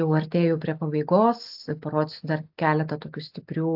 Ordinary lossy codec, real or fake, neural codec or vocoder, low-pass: AAC, 48 kbps; real; none; 5.4 kHz